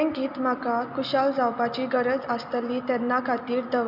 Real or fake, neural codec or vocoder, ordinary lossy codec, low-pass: real; none; none; 5.4 kHz